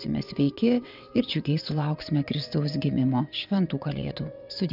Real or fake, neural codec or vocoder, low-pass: fake; vocoder, 22.05 kHz, 80 mel bands, WaveNeXt; 5.4 kHz